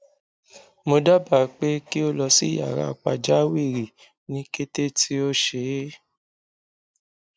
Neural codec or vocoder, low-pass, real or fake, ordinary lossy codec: none; none; real; none